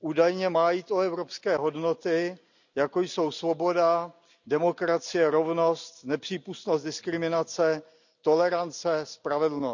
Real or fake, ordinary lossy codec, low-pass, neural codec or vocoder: real; none; 7.2 kHz; none